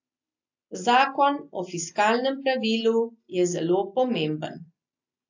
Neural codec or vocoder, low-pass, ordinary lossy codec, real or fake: none; 7.2 kHz; AAC, 48 kbps; real